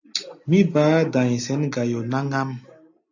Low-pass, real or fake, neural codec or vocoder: 7.2 kHz; real; none